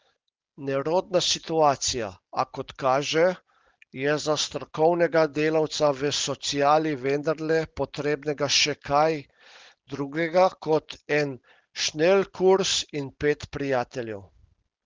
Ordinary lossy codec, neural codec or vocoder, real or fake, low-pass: Opus, 16 kbps; none; real; 7.2 kHz